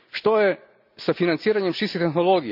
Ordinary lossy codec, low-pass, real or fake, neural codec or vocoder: none; 5.4 kHz; real; none